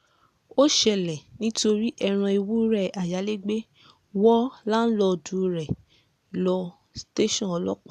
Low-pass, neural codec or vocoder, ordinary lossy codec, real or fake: 14.4 kHz; none; none; real